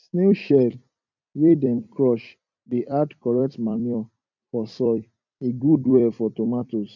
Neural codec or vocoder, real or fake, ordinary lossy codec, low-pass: vocoder, 44.1 kHz, 128 mel bands every 256 samples, BigVGAN v2; fake; none; 7.2 kHz